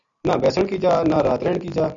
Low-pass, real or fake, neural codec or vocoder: 7.2 kHz; real; none